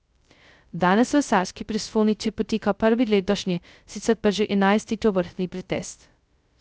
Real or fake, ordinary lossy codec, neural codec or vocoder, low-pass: fake; none; codec, 16 kHz, 0.2 kbps, FocalCodec; none